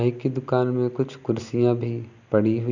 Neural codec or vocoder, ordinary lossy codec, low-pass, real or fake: none; none; 7.2 kHz; real